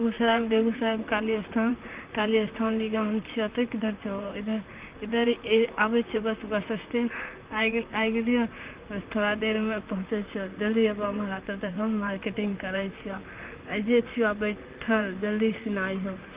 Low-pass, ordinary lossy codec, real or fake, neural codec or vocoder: 3.6 kHz; Opus, 32 kbps; fake; vocoder, 44.1 kHz, 128 mel bands, Pupu-Vocoder